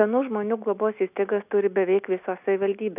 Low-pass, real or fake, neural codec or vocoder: 3.6 kHz; real; none